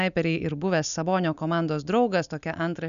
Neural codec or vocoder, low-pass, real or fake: none; 7.2 kHz; real